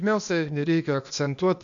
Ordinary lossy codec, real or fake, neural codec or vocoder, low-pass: AAC, 64 kbps; fake; codec, 16 kHz, 0.8 kbps, ZipCodec; 7.2 kHz